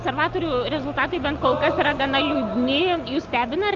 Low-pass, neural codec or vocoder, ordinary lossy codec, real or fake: 7.2 kHz; none; Opus, 24 kbps; real